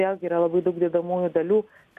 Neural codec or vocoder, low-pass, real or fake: none; 14.4 kHz; real